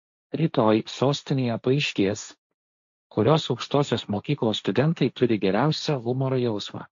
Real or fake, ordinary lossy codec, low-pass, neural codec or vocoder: fake; MP3, 48 kbps; 7.2 kHz; codec, 16 kHz, 1.1 kbps, Voila-Tokenizer